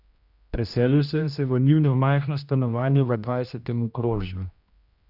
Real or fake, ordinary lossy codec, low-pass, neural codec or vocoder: fake; Opus, 64 kbps; 5.4 kHz; codec, 16 kHz, 1 kbps, X-Codec, HuBERT features, trained on general audio